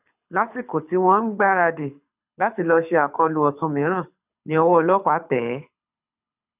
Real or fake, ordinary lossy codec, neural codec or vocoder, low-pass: fake; none; codec, 24 kHz, 6 kbps, HILCodec; 3.6 kHz